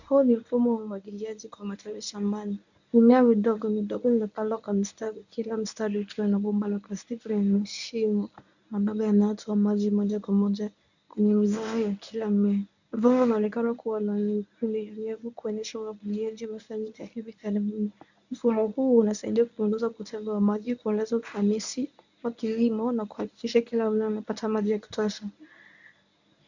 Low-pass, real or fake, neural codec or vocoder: 7.2 kHz; fake; codec, 24 kHz, 0.9 kbps, WavTokenizer, medium speech release version 1